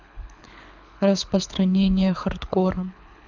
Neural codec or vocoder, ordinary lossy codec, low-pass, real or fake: codec, 24 kHz, 6 kbps, HILCodec; none; 7.2 kHz; fake